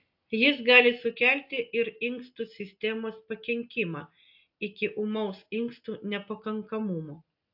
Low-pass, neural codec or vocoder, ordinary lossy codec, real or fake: 5.4 kHz; none; AAC, 48 kbps; real